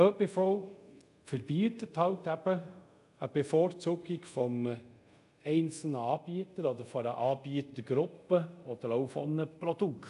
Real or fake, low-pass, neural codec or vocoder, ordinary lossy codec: fake; 10.8 kHz; codec, 24 kHz, 0.5 kbps, DualCodec; none